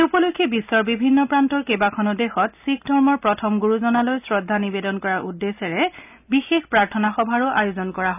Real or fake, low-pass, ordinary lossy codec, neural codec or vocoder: real; 3.6 kHz; none; none